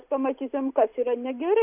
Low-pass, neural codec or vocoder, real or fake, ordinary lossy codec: 3.6 kHz; none; real; AAC, 32 kbps